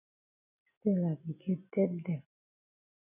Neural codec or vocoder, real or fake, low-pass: none; real; 3.6 kHz